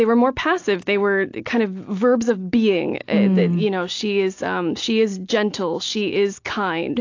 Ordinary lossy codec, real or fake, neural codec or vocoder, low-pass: AAC, 48 kbps; real; none; 7.2 kHz